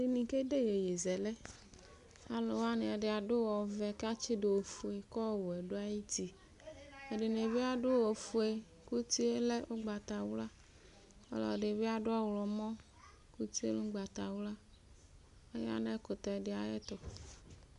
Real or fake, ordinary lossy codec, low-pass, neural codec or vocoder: real; AAC, 96 kbps; 10.8 kHz; none